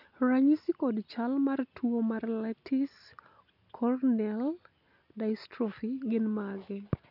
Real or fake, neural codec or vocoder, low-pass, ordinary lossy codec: real; none; 5.4 kHz; none